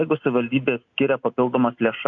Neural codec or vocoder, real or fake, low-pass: none; real; 7.2 kHz